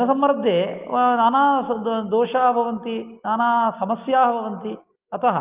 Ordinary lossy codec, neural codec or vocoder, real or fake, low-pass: Opus, 24 kbps; none; real; 3.6 kHz